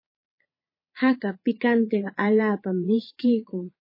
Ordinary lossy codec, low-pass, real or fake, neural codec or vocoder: MP3, 24 kbps; 5.4 kHz; fake; vocoder, 22.05 kHz, 80 mel bands, Vocos